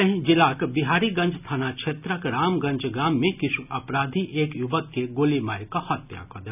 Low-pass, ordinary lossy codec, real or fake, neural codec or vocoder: 3.6 kHz; none; real; none